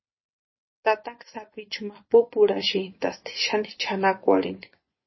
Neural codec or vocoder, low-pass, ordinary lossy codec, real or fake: vocoder, 24 kHz, 100 mel bands, Vocos; 7.2 kHz; MP3, 24 kbps; fake